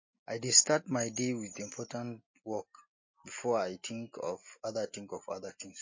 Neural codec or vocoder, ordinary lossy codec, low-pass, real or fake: none; MP3, 32 kbps; 7.2 kHz; real